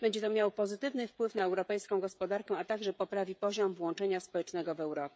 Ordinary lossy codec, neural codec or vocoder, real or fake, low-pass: none; codec, 16 kHz, 16 kbps, FreqCodec, smaller model; fake; none